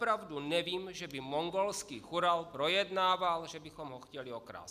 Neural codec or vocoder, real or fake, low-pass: none; real; 14.4 kHz